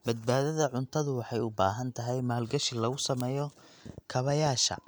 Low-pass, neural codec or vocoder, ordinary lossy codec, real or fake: none; none; none; real